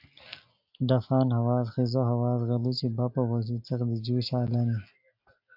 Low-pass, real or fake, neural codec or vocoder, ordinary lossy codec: 5.4 kHz; real; none; AAC, 48 kbps